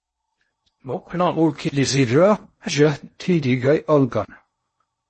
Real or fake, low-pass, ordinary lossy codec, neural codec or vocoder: fake; 10.8 kHz; MP3, 32 kbps; codec, 16 kHz in and 24 kHz out, 0.6 kbps, FocalCodec, streaming, 4096 codes